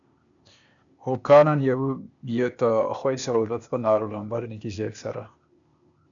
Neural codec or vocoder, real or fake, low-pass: codec, 16 kHz, 0.8 kbps, ZipCodec; fake; 7.2 kHz